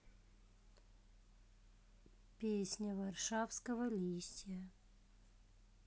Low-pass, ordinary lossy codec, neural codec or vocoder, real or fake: none; none; none; real